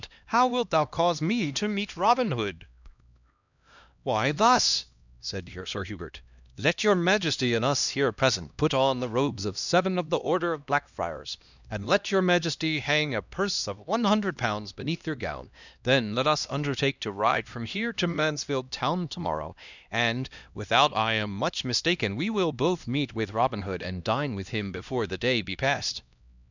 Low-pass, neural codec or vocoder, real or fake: 7.2 kHz; codec, 16 kHz, 1 kbps, X-Codec, HuBERT features, trained on LibriSpeech; fake